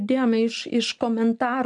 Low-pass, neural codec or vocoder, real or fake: 10.8 kHz; none; real